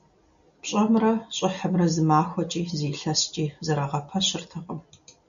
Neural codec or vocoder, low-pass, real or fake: none; 7.2 kHz; real